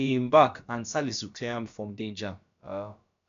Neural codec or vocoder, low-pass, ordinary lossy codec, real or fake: codec, 16 kHz, about 1 kbps, DyCAST, with the encoder's durations; 7.2 kHz; AAC, 64 kbps; fake